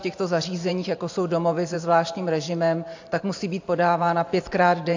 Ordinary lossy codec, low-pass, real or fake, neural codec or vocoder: AAC, 48 kbps; 7.2 kHz; fake; vocoder, 44.1 kHz, 128 mel bands every 512 samples, BigVGAN v2